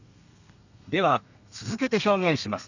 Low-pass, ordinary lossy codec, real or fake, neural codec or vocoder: 7.2 kHz; none; fake; codec, 32 kHz, 1.9 kbps, SNAC